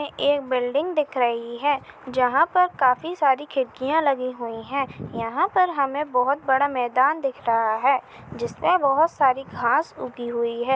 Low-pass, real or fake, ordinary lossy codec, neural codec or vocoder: none; real; none; none